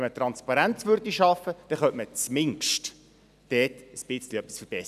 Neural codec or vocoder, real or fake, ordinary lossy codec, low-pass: none; real; none; 14.4 kHz